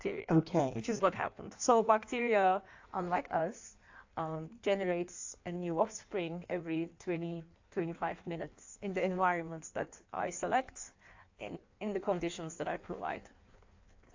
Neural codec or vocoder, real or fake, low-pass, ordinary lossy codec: codec, 16 kHz in and 24 kHz out, 1.1 kbps, FireRedTTS-2 codec; fake; 7.2 kHz; AAC, 48 kbps